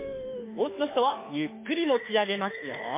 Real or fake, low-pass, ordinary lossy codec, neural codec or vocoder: fake; 3.6 kHz; MP3, 24 kbps; autoencoder, 48 kHz, 32 numbers a frame, DAC-VAE, trained on Japanese speech